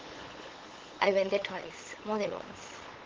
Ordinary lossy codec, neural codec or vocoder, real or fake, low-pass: Opus, 16 kbps; codec, 16 kHz, 8 kbps, FunCodec, trained on LibriTTS, 25 frames a second; fake; 7.2 kHz